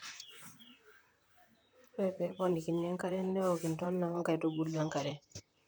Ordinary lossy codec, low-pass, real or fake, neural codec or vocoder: none; none; fake; vocoder, 44.1 kHz, 128 mel bands, Pupu-Vocoder